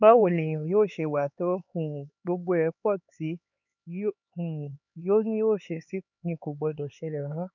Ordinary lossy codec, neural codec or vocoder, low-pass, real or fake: none; codec, 16 kHz, 4 kbps, X-Codec, HuBERT features, trained on LibriSpeech; 7.2 kHz; fake